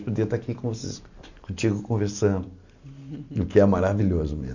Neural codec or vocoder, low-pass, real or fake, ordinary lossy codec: none; 7.2 kHz; real; none